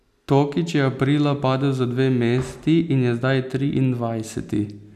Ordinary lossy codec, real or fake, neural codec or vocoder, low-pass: none; real; none; 14.4 kHz